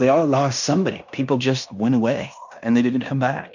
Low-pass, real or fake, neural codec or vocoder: 7.2 kHz; fake; codec, 16 kHz in and 24 kHz out, 0.9 kbps, LongCat-Audio-Codec, fine tuned four codebook decoder